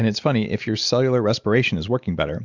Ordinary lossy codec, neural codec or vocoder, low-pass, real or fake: Opus, 64 kbps; none; 7.2 kHz; real